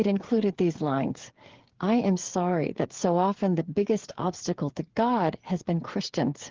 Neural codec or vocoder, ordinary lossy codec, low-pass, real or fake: codec, 16 kHz, 8 kbps, FreqCodec, smaller model; Opus, 16 kbps; 7.2 kHz; fake